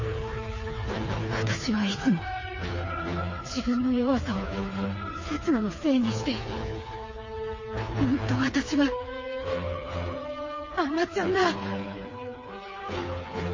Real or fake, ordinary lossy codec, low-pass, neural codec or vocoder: fake; MP3, 32 kbps; 7.2 kHz; codec, 16 kHz, 4 kbps, FreqCodec, smaller model